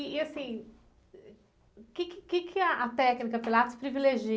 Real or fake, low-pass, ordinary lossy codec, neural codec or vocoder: real; none; none; none